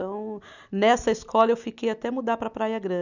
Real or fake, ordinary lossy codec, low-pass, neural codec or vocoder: real; none; 7.2 kHz; none